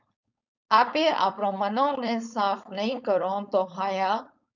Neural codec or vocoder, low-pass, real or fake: codec, 16 kHz, 4.8 kbps, FACodec; 7.2 kHz; fake